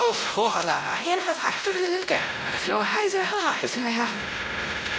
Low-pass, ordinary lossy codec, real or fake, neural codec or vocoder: none; none; fake; codec, 16 kHz, 0.5 kbps, X-Codec, WavLM features, trained on Multilingual LibriSpeech